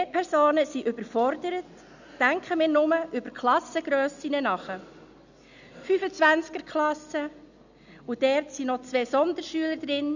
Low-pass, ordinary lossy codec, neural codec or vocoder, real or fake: 7.2 kHz; none; none; real